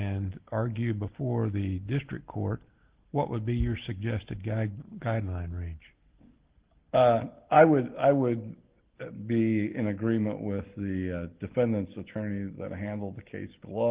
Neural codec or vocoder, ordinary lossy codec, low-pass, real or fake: none; Opus, 16 kbps; 3.6 kHz; real